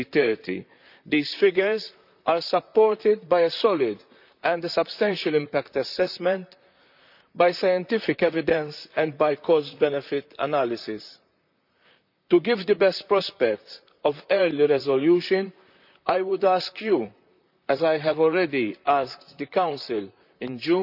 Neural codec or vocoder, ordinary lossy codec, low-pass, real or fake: vocoder, 44.1 kHz, 128 mel bands, Pupu-Vocoder; none; 5.4 kHz; fake